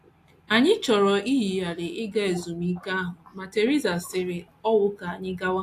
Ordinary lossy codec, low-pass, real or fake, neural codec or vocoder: AAC, 64 kbps; 14.4 kHz; real; none